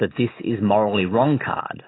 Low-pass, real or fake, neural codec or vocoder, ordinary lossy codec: 7.2 kHz; real; none; AAC, 16 kbps